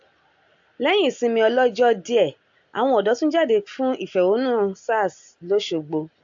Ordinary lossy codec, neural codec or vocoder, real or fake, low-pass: AAC, 64 kbps; none; real; 7.2 kHz